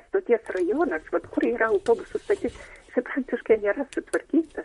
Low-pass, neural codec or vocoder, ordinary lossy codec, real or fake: 19.8 kHz; vocoder, 44.1 kHz, 128 mel bands, Pupu-Vocoder; MP3, 48 kbps; fake